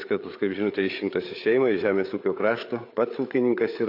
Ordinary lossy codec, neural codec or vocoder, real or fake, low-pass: AAC, 32 kbps; codec, 16 kHz, 8 kbps, FreqCodec, larger model; fake; 5.4 kHz